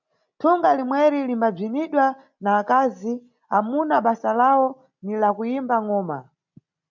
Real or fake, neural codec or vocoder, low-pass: real; none; 7.2 kHz